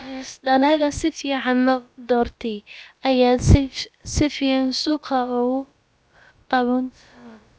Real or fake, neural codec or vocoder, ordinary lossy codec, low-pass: fake; codec, 16 kHz, about 1 kbps, DyCAST, with the encoder's durations; none; none